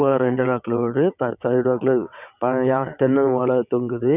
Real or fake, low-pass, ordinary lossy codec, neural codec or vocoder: fake; 3.6 kHz; none; vocoder, 22.05 kHz, 80 mel bands, WaveNeXt